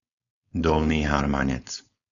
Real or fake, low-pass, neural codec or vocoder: fake; 7.2 kHz; codec, 16 kHz, 4.8 kbps, FACodec